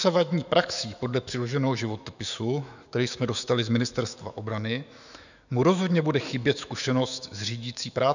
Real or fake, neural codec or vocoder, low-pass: fake; autoencoder, 48 kHz, 128 numbers a frame, DAC-VAE, trained on Japanese speech; 7.2 kHz